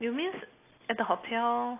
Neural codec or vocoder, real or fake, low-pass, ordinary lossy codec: none; real; 3.6 kHz; AAC, 24 kbps